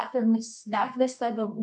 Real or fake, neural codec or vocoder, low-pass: fake; codec, 24 kHz, 0.9 kbps, WavTokenizer, medium music audio release; 10.8 kHz